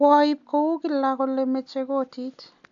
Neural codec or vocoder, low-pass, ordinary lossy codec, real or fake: none; 7.2 kHz; AAC, 64 kbps; real